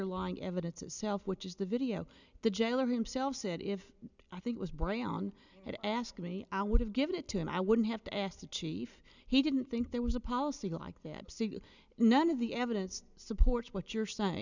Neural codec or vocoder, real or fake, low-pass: none; real; 7.2 kHz